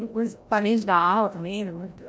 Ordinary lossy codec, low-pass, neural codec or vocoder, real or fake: none; none; codec, 16 kHz, 0.5 kbps, FreqCodec, larger model; fake